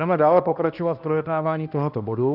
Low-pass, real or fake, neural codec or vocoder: 5.4 kHz; fake; codec, 16 kHz, 1 kbps, X-Codec, HuBERT features, trained on balanced general audio